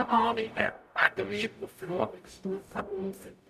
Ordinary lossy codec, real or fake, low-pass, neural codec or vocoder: none; fake; 14.4 kHz; codec, 44.1 kHz, 0.9 kbps, DAC